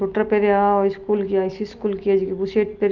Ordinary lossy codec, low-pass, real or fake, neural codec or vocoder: Opus, 32 kbps; 7.2 kHz; real; none